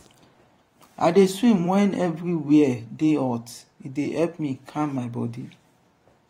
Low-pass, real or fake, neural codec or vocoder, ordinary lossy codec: 19.8 kHz; fake; vocoder, 44.1 kHz, 128 mel bands every 512 samples, BigVGAN v2; AAC, 48 kbps